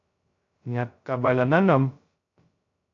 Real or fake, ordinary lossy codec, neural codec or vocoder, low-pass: fake; AAC, 48 kbps; codec, 16 kHz, 0.2 kbps, FocalCodec; 7.2 kHz